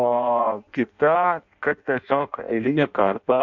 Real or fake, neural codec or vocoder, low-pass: fake; codec, 16 kHz in and 24 kHz out, 0.6 kbps, FireRedTTS-2 codec; 7.2 kHz